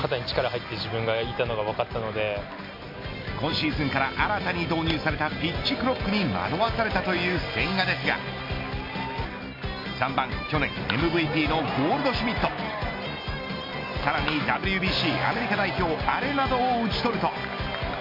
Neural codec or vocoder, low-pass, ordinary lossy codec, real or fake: none; 5.4 kHz; MP3, 32 kbps; real